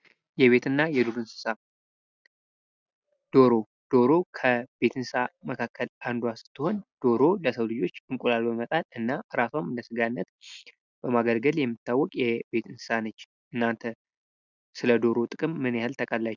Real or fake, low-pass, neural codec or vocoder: real; 7.2 kHz; none